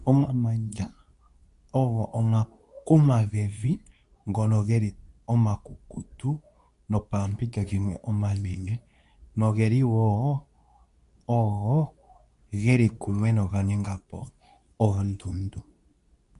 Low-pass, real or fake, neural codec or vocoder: 10.8 kHz; fake; codec, 24 kHz, 0.9 kbps, WavTokenizer, medium speech release version 2